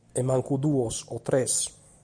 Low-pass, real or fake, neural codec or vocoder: 9.9 kHz; real; none